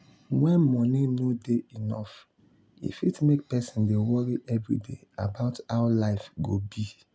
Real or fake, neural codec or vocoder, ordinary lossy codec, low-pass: real; none; none; none